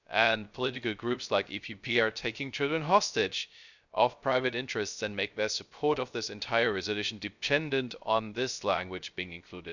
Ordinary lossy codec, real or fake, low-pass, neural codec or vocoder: none; fake; 7.2 kHz; codec, 16 kHz, 0.3 kbps, FocalCodec